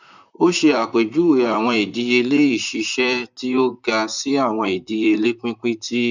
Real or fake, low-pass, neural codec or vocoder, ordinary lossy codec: fake; 7.2 kHz; vocoder, 44.1 kHz, 128 mel bands, Pupu-Vocoder; none